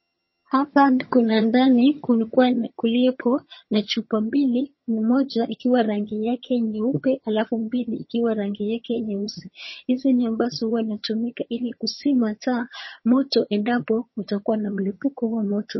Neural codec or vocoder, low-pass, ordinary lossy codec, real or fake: vocoder, 22.05 kHz, 80 mel bands, HiFi-GAN; 7.2 kHz; MP3, 24 kbps; fake